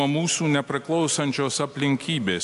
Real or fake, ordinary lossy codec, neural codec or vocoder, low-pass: real; AAC, 64 kbps; none; 10.8 kHz